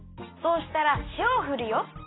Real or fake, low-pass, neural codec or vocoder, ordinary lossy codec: real; 7.2 kHz; none; AAC, 16 kbps